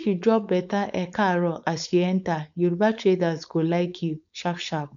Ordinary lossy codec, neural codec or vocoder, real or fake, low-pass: none; codec, 16 kHz, 4.8 kbps, FACodec; fake; 7.2 kHz